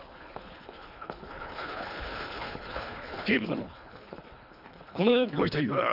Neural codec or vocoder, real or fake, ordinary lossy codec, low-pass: codec, 24 kHz, 3 kbps, HILCodec; fake; none; 5.4 kHz